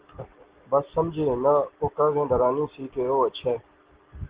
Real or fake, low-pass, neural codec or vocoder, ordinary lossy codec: real; 3.6 kHz; none; Opus, 16 kbps